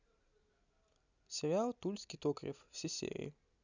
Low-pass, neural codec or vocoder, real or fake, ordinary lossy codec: 7.2 kHz; none; real; none